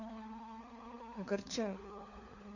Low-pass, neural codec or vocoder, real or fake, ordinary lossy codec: 7.2 kHz; codec, 16 kHz, 4 kbps, FunCodec, trained on LibriTTS, 50 frames a second; fake; none